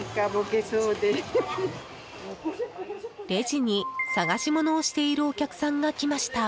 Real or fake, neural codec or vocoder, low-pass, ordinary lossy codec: real; none; none; none